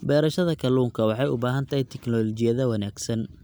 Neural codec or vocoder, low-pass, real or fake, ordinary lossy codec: none; none; real; none